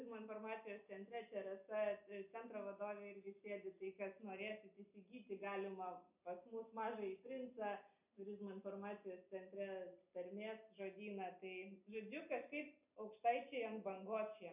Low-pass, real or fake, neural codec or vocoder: 3.6 kHz; real; none